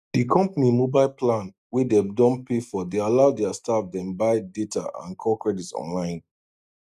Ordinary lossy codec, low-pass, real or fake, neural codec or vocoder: none; 14.4 kHz; real; none